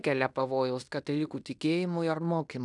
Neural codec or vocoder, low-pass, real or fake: codec, 16 kHz in and 24 kHz out, 0.9 kbps, LongCat-Audio-Codec, fine tuned four codebook decoder; 10.8 kHz; fake